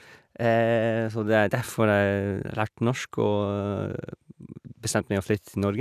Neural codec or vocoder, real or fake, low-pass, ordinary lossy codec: none; real; 14.4 kHz; none